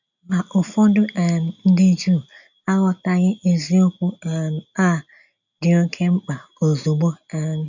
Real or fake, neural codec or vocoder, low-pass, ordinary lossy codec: real; none; 7.2 kHz; none